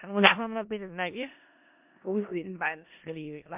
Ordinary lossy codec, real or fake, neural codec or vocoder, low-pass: MP3, 32 kbps; fake; codec, 16 kHz in and 24 kHz out, 0.4 kbps, LongCat-Audio-Codec, four codebook decoder; 3.6 kHz